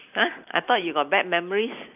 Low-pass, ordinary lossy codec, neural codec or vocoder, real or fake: 3.6 kHz; none; vocoder, 44.1 kHz, 128 mel bands every 512 samples, BigVGAN v2; fake